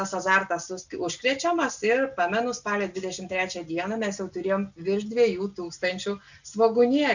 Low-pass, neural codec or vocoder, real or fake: 7.2 kHz; none; real